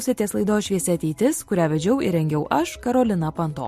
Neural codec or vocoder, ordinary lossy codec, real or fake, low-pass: none; MP3, 64 kbps; real; 14.4 kHz